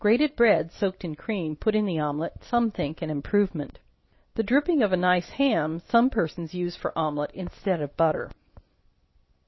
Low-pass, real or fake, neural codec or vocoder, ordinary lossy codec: 7.2 kHz; real; none; MP3, 24 kbps